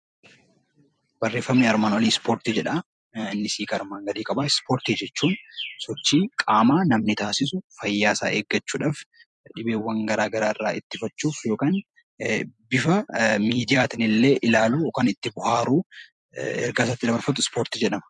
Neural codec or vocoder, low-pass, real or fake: vocoder, 44.1 kHz, 128 mel bands every 256 samples, BigVGAN v2; 10.8 kHz; fake